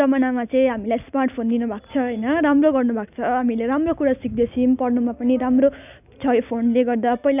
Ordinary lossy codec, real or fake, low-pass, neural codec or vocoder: none; real; 3.6 kHz; none